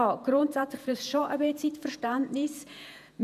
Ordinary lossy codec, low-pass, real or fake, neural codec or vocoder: none; 14.4 kHz; fake; vocoder, 48 kHz, 128 mel bands, Vocos